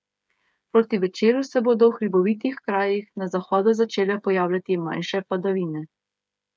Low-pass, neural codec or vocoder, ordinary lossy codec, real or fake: none; codec, 16 kHz, 8 kbps, FreqCodec, smaller model; none; fake